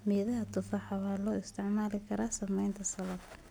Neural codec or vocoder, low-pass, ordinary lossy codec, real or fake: none; none; none; real